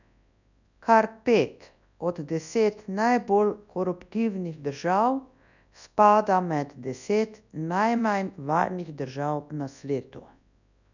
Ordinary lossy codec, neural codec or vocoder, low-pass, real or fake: none; codec, 24 kHz, 0.9 kbps, WavTokenizer, large speech release; 7.2 kHz; fake